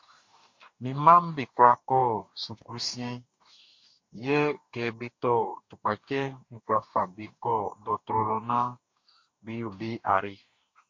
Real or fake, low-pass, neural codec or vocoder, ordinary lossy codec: fake; 7.2 kHz; codec, 44.1 kHz, 2.6 kbps, DAC; MP3, 48 kbps